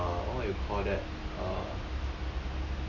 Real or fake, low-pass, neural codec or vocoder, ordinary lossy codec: real; 7.2 kHz; none; none